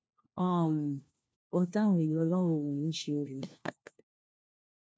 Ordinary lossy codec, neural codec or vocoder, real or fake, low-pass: none; codec, 16 kHz, 1 kbps, FunCodec, trained on LibriTTS, 50 frames a second; fake; none